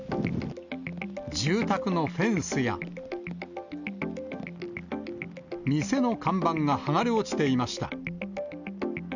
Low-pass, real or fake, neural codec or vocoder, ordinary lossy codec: 7.2 kHz; real; none; none